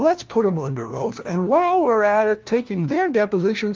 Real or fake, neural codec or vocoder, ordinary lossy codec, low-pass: fake; codec, 16 kHz, 1 kbps, FunCodec, trained on LibriTTS, 50 frames a second; Opus, 24 kbps; 7.2 kHz